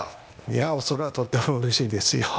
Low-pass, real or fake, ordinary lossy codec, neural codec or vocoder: none; fake; none; codec, 16 kHz, 0.8 kbps, ZipCodec